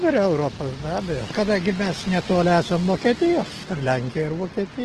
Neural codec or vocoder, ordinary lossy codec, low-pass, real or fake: none; Opus, 16 kbps; 10.8 kHz; real